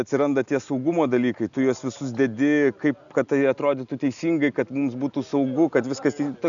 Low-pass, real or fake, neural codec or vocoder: 7.2 kHz; real; none